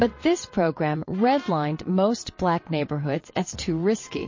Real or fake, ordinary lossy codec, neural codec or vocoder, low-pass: fake; MP3, 32 kbps; vocoder, 22.05 kHz, 80 mel bands, WaveNeXt; 7.2 kHz